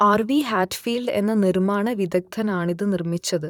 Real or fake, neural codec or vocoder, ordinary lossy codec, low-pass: fake; vocoder, 44.1 kHz, 128 mel bands, Pupu-Vocoder; none; 19.8 kHz